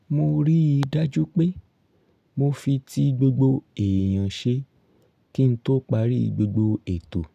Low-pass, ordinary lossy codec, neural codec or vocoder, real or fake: 14.4 kHz; none; none; real